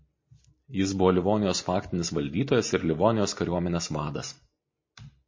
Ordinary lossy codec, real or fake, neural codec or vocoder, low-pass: MP3, 32 kbps; real; none; 7.2 kHz